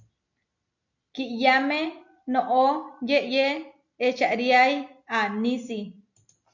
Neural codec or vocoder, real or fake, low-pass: none; real; 7.2 kHz